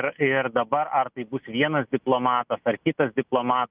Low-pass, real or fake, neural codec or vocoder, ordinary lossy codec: 3.6 kHz; real; none; Opus, 24 kbps